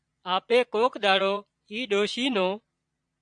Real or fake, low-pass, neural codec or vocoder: fake; 9.9 kHz; vocoder, 22.05 kHz, 80 mel bands, Vocos